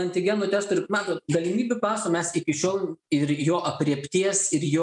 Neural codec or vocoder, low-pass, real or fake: none; 10.8 kHz; real